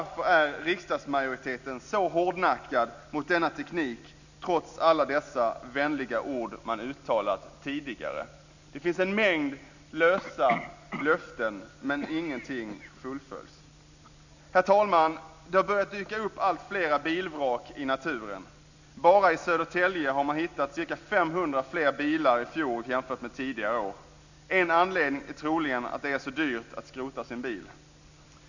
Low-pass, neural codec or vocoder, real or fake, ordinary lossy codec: 7.2 kHz; none; real; none